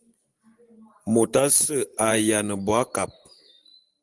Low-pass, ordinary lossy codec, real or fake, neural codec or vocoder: 10.8 kHz; Opus, 24 kbps; fake; vocoder, 44.1 kHz, 128 mel bands every 512 samples, BigVGAN v2